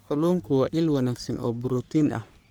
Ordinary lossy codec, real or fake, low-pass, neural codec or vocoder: none; fake; none; codec, 44.1 kHz, 3.4 kbps, Pupu-Codec